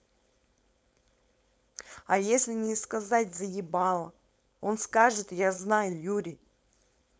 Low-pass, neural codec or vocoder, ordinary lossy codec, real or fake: none; codec, 16 kHz, 4.8 kbps, FACodec; none; fake